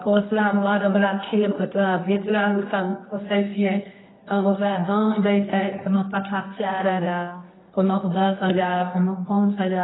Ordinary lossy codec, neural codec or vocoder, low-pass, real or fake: AAC, 16 kbps; codec, 24 kHz, 0.9 kbps, WavTokenizer, medium music audio release; 7.2 kHz; fake